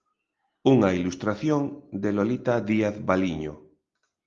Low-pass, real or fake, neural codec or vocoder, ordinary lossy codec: 7.2 kHz; real; none; Opus, 32 kbps